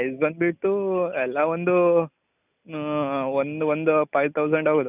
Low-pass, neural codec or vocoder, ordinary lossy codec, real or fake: 3.6 kHz; none; none; real